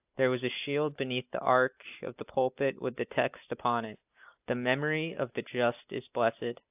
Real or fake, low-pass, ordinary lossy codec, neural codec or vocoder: real; 3.6 kHz; AAC, 32 kbps; none